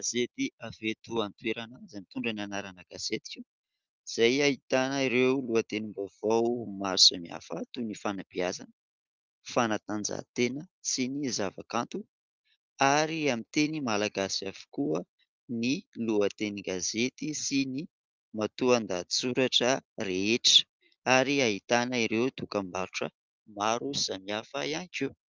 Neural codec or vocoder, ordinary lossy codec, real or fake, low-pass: none; Opus, 24 kbps; real; 7.2 kHz